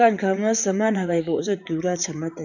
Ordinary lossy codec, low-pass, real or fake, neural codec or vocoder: none; 7.2 kHz; fake; vocoder, 44.1 kHz, 128 mel bands, Pupu-Vocoder